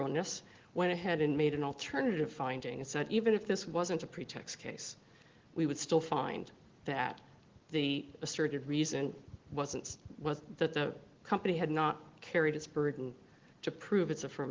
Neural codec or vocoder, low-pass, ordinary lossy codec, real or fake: none; 7.2 kHz; Opus, 16 kbps; real